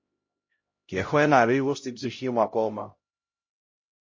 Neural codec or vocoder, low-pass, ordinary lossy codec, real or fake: codec, 16 kHz, 0.5 kbps, X-Codec, HuBERT features, trained on LibriSpeech; 7.2 kHz; MP3, 32 kbps; fake